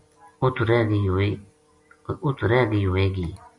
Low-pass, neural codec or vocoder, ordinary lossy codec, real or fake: 10.8 kHz; none; MP3, 64 kbps; real